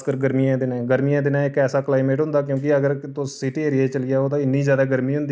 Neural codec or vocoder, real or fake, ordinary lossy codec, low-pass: none; real; none; none